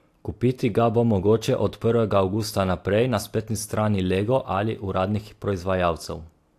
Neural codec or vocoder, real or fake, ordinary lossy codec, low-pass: none; real; AAC, 64 kbps; 14.4 kHz